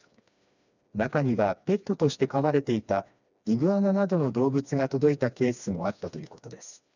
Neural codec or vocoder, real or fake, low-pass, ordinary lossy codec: codec, 16 kHz, 2 kbps, FreqCodec, smaller model; fake; 7.2 kHz; none